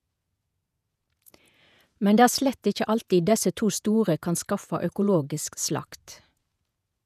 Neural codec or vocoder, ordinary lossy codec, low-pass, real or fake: vocoder, 48 kHz, 128 mel bands, Vocos; none; 14.4 kHz; fake